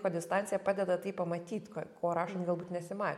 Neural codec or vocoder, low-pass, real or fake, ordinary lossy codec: none; 19.8 kHz; real; MP3, 64 kbps